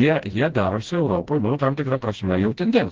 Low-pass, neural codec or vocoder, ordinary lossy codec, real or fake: 7.2 kHz; codec, 16 kHz, 1 kbps, FreqCodec, smaller model; Opus, 16 kbps; fake